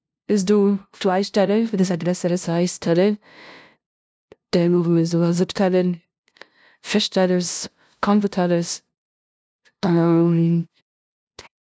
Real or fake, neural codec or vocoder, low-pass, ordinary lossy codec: fake; codec, 16 kHz, 0.5 kbps, FunCodec, trained on LibriTTS, 25 frames a second; none; none